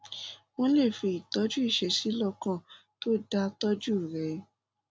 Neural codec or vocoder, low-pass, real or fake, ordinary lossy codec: none; none; real; none